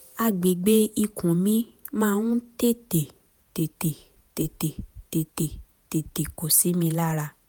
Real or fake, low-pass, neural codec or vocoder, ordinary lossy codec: fake; none; vocoder, 48 kHz, 128 mel bands, Vocos; none